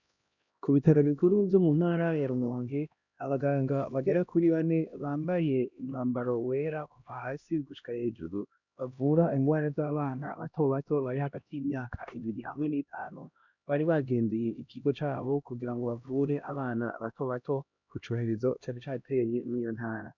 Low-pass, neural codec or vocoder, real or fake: 7.2 kHz; codec, 16 kHz, 1 kbps, X-Codec, HuBERT features, trained on LibriSpeech; fake